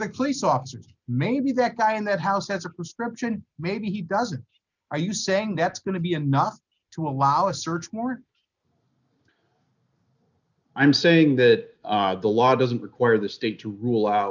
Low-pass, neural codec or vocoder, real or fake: 7.2 kHz; none; real